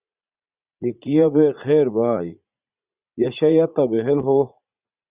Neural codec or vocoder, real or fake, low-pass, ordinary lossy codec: vocoder, 44.1 kHz, 80 mel bands, Vocos; fake; 3.6 kHz; Opus, 64 kbps